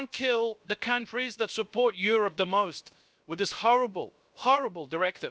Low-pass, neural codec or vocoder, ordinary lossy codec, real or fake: none; codec, 16 kHz, 0.7 kbps, FocalCodec; none; fake